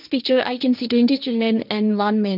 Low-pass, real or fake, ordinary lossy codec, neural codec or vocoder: 5.4 kHz; fake; none; codec, 16 kHz, 1.1 kbps, Voila-Tokenizer